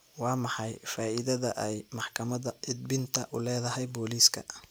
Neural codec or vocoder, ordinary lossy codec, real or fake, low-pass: none; none; real; none